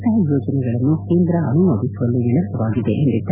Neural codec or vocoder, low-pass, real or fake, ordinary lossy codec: none; 3.6 kHz; real; none